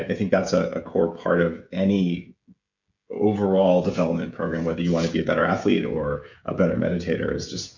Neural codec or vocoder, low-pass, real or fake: codec, 16 kHz, 16 kbps, FreqCodec, smaller model; 7.2 kHz; fake